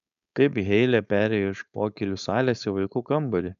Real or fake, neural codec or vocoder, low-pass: fake; codec, 16 kHz, 4.8 kbps, FACodec; 7.2 kHz